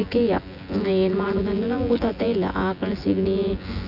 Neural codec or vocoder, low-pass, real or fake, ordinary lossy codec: vocoder, 24 kHz, 100 mel bands, Vocos; 5.4 kHz; fake; none